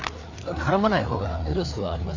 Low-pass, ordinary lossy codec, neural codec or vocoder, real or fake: 7.2 kHz; AAC, 48 kbps; codec, 16 kHz, 4 kbps, FreqCodec, larger model; fake